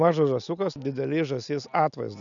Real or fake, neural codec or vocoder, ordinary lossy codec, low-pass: real; none; MP3, 96 kbps; 7.2 kHz